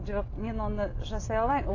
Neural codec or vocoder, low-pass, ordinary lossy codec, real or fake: none; 7.2 kHz; AAC, 32 kbps; real